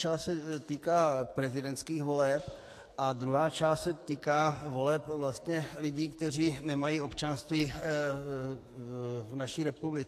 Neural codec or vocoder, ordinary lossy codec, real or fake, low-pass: codec, 44.1 kHz, 2.6 kbps, SNAC; AAC, 64 kbps; fake; 14.4 kHz